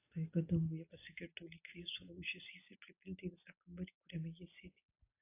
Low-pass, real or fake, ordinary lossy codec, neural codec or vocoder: 3.6 kHz; real; AAC, 32 kbps; none